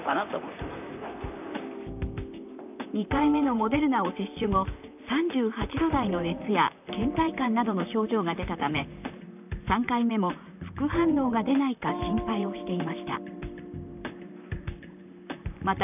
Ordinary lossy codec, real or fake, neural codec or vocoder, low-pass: none; fake; vocoder, 44.1 kHz, 128 mel bands, Pupu-Vocoder; 3.6 kHz